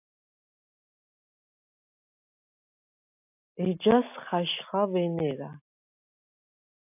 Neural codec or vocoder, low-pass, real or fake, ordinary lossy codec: none; 3.6 kHz; real; AAC, 32 kbps